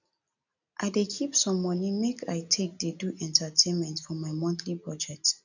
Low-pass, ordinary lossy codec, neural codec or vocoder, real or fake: 7.2 kHz; none; none; real